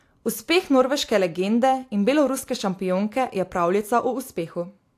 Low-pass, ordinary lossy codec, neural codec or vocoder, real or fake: 14.4 kHz; AAC, 64 kbps; none; real